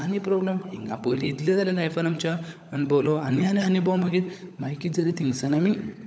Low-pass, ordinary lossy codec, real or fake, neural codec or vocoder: none; none; fake; codec, 16 kHz, 16 kbps, FunCodec, trained on LibriTTS, 50 frames a second